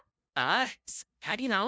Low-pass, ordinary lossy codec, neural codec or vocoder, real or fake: none; none; codec, 16 kHz, 0.5 kbps, FunCodec, trained on LibriTTS, 25 frames a second; fake